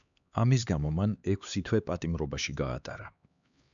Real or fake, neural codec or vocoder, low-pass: fake; codec, 16 kHz, 4 kbps, X-Codec, HuBERT features, trained on LibriSpeech; 7.2 kHz